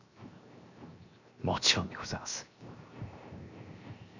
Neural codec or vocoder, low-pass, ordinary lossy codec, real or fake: codec, 16 kHz, 0.7 kbps, FocalCodec; 7.2 kHz; none; fake